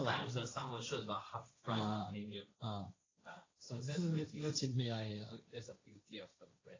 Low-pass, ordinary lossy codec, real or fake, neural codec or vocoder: 7.2 kHz; AAC, 32 kbps; fake; codec, 16 kHz, 1.1 kbps, Voila-Tokenizer